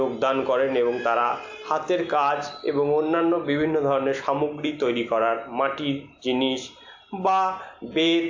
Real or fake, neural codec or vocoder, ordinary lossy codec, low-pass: real; none; AAC, 48 kbps; 7.2 kHz